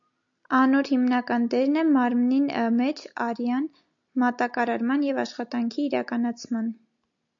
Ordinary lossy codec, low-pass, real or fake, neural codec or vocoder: AAC, 64 kbps; 7.2 kHz; real; none